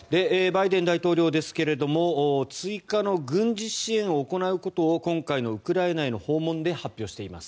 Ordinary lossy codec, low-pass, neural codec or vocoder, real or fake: none; none; none; real